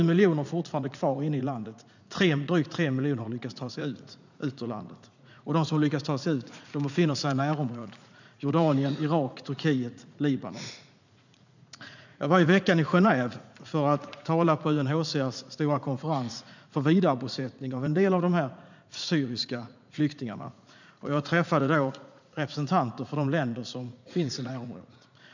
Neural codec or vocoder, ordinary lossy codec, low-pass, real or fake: vocoder, 44.1 kHz, 80 mel bands, Vocos; none; 7.2 kHz; fake